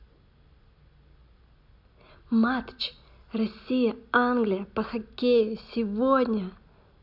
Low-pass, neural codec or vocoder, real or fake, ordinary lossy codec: 5.4 kHz; none; real; none